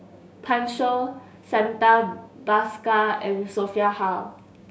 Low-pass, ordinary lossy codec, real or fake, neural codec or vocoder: none; none; fake; codec, 16 kHz, 6 kbps, DAC